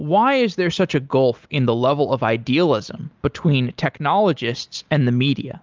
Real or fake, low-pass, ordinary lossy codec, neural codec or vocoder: real; 7.2 kHz; Opus, 24 kbps; none